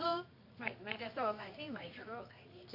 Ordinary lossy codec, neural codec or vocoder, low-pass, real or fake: AAC, 48 kbps; codec, 24 kHz, 0.9 kbps, WavTokenizer, medium music audio release; 5.4 kHz; fake